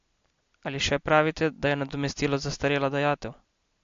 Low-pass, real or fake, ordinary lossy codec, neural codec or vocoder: 7.2 kHz; real; MP3, 48 kbps; none